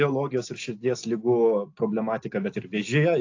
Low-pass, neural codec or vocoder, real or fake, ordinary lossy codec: 7.2 kHz; none; real; AAC, 48 kbps